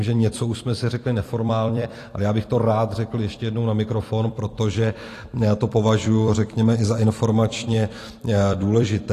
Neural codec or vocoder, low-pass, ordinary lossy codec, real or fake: vocoder, 44.1 kHz, 128 mel bands every 256 samples, BigVGAN v2; 14.4 kHz; AAC, 48 kbps; fake